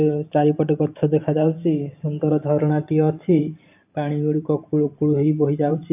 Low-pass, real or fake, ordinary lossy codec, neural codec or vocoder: 3.6 kHz; fake; AAC, 32 kbps; vocoder, 44.1 kHz, 128 mel bands every 512 samples, BigVGAN v2